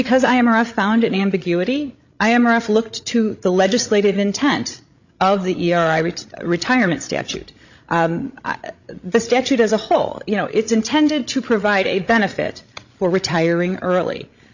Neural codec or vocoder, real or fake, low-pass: codec, 16 kHz, 16 kbps, FreqCodec, larger model; fake; 7.2 kHz